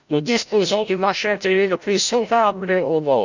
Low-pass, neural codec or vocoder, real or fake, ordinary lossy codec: 7.2 kHz; codec, 16 kHz, 0.5 kbps, FreqCodec, larger model; fake; none